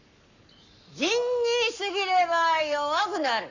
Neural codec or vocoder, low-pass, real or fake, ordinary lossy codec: codec, 44.1 kHz, 7.8 kbps, Pupu-Codec; 7.2 kHz; fake; none